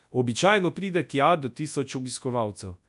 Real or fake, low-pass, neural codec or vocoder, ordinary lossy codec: fake; 10.8 kHz; codec, 24 kHz, 0.9 kbps, WavTokenizer, large speech release; AAC, 96 kbps